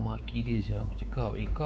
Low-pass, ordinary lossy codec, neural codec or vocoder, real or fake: none; none; codec, 16 kHz, 4 kbps, X-Codec, WavLM features, trained on Multilingual LibriSpeech; fake